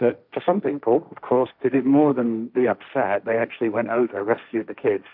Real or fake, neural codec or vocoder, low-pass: fake; codec, 16 kHz, 1.1 kbps, Voila-Tokenizer; 5.4 kHz